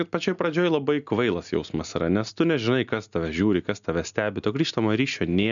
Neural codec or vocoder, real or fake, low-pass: none; real; 7.2 kHz